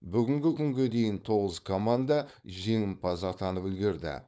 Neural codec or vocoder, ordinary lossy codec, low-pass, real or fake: codec, 16 kHz, 4.8 kbps, FACodec; none; none; fake